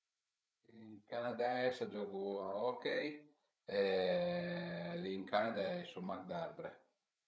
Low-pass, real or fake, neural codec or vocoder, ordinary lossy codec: none; fake; codec, 16 kHz, 8 kbps, FreqCodec, larger model; none